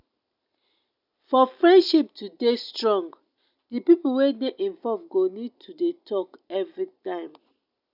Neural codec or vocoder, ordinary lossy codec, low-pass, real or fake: none; none; 5.4 kHz; real